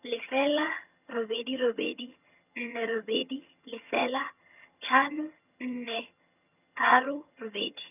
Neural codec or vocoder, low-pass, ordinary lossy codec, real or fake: vocoder, 22.05 kHz, 80 mel bands, HiFi-GAN; 3.6 kHz; none; fake